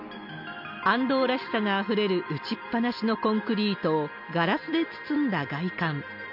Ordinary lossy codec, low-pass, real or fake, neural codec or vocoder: none; 5.4 kHz; real; none